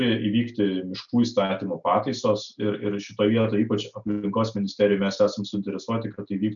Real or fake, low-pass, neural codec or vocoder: real; 7.2 kHz; none